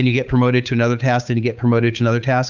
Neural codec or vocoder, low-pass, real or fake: codec, 16 kHz, 8 kbps, FunCodec, trained on Chinese and English, 25 frames a second; 7.2 kHz; fake